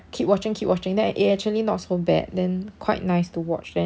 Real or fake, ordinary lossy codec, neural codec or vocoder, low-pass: real; none; none; none